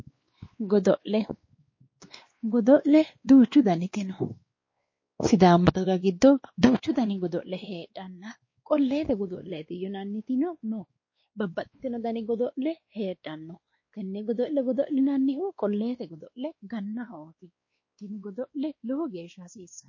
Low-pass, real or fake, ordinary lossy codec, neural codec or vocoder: 7.2 kHz; fake; MP3, 32 kbps; codec, 16 kHz, 2 kbps, X-Codec, WavLM features, trained on Multilingual LibriSpeech